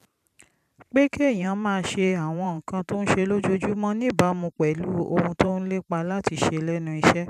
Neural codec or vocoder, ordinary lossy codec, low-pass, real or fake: vocoder, 44.1 kHz, 128 mel bands every 512 samples, BigVGAN v2; none; 14.4 kHz; fake